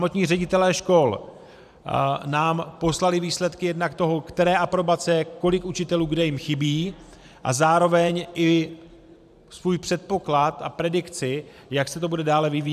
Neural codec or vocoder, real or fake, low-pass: vocoder, 44.1 kHz, 128 mel bands every 512 samples, BigVGAN v2; fake; 14.4 kHz